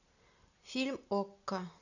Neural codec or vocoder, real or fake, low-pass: none; real; 7.2 kHz